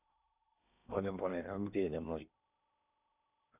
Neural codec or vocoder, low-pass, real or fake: codec, 16 kHz in and 24 kHz out, 0.8 kbps, FocalCodec, streaming, 65536 codes; 3.6 kHz; fake